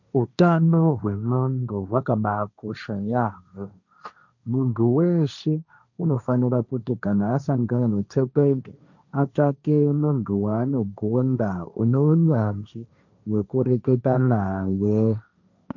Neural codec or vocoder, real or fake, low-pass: codec, 16 kHz, 1.1 kbps, Voila-Tokenizer; fake; 7.2 kHz